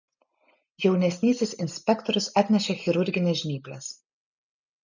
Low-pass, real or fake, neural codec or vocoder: 7.2 kHz; real; none